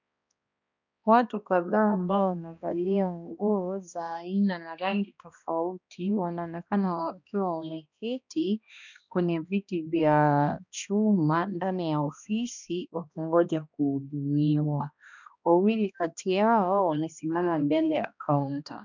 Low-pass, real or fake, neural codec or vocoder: 7.2 kHz; fake; codec, 16 kHz, 1 kbps, X-Codec, HuBERT features, trained on balanced general audio